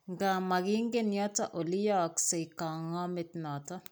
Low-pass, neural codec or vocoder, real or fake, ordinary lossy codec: none; none; real; none